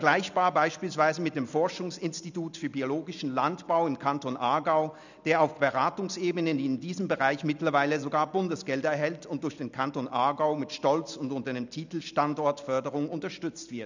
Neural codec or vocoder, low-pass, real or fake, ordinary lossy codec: none; 7.2 kHz; real; none